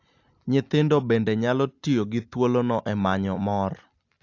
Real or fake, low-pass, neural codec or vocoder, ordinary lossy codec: real; 7.2 kHz; none; AAC, 48 kbps